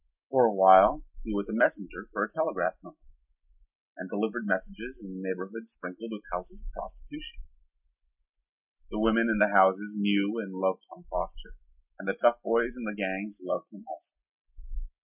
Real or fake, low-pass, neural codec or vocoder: real; 3.6 kHz; none